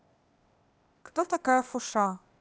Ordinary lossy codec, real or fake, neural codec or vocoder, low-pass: none; fake; codec, 16 kHz, 0.8 kbps, ZipCodec; none